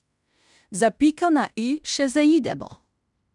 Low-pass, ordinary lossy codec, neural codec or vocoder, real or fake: 10.8 kHz; none; codec, 16 kHz in and 24 kHz out, 0.9 kbps, LongCat-Audio-Codec, fine tuned four codebook decoder; fake